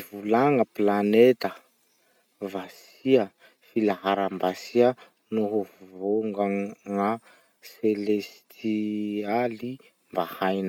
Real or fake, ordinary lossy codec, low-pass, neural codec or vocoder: real; none; 19.8 kHz; none